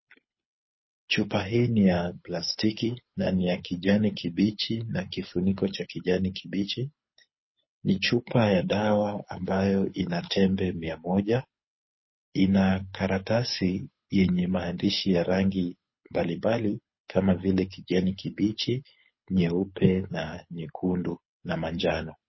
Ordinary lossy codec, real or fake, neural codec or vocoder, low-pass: MP3, 24 kbps; fake; codec, 24 kHz, 6 kbps, HILCodec; 7.2 kHz